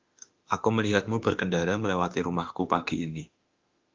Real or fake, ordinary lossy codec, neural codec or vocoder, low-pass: fake; Opus, 24 kbps; autoencoder, 48 kHz, 32 numbers a frame, DAC-VAE, trained on Japanese speech; 7.2 kHz